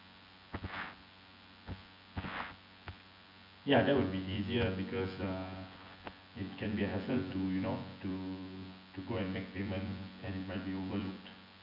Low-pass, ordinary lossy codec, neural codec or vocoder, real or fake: 5.4 kHz; none; vocoder, 24 kHz, 100 mel bands, Vocos; fake